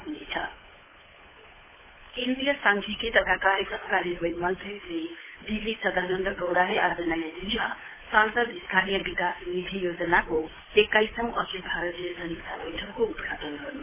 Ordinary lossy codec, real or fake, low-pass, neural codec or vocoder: MP3, 16 kbps; fake; 3.6 kHz; codec, 16 kHz, 8 kbps, FunCodec, trained on Chinese and English, 25 frames a second